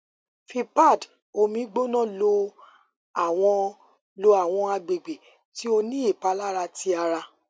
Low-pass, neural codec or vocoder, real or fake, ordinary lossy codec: none; none; real; none